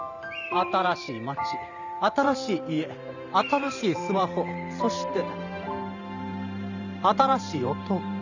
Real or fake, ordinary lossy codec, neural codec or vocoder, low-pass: fake; none; vocoder, 44.1 kHz, 128 mel bands every 512 samples, BigVGAN v2; 7.2 kHz